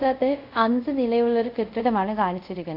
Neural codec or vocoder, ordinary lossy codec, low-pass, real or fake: codec, 24 kHz, 0.5 kbps, DualCodec; none; 5.4 kHz; fake